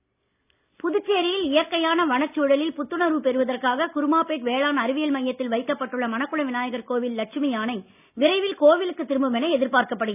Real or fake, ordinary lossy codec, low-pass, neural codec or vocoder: real; none; 3.6 kHz; none